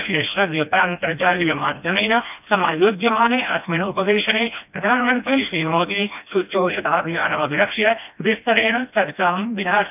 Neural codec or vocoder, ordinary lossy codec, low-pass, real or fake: codec, 16 kHz, 1 kbps, FreqCodec, smaller model; none; 3.6 kHz; fake